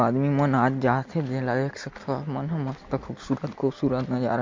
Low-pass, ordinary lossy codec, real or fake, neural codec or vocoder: 7.2 kHz; MP3, 48 kbps; real; none